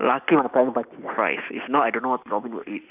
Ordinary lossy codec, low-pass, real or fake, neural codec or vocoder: none; 3.6 kHz; real; none